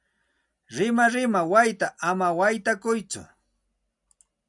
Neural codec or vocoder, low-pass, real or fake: none; 10.8 kHz; real